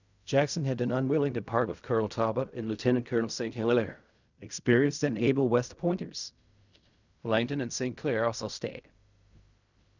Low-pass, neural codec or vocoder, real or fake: 7.2 kHz; codec, 16 kHz in and 24 kHz out, 0.4 kbps, LongCat-Audio-Codec, fine tuned four codebook decoder; fake